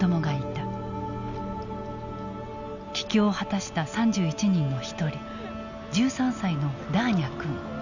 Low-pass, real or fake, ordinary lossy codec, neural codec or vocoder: 7.2 kHz; real; none; none